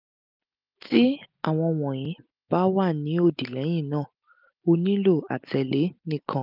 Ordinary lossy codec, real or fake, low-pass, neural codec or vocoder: none; real; 5.4 kHz; none